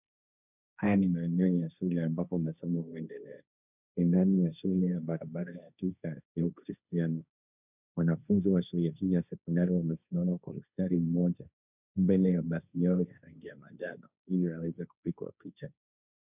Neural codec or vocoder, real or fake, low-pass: codec, 16 kHz, 1.1 kbps, Voila-Tokenizer; fake; 3.6 kHz